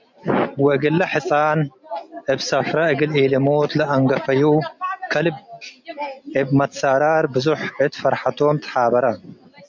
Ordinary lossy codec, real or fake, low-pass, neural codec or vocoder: MP3, 64 kbps; real; 7.2 kHz; none